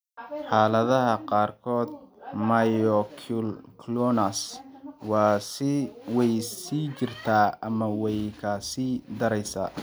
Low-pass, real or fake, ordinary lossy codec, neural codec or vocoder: none; real; none; none